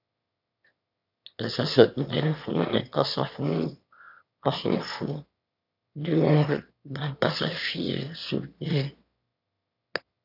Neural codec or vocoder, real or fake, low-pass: autoencoder, 22.05 kHz, a latent of 192 numbers a frame, VITS, trained on one speaker; fake; 5.4 kHz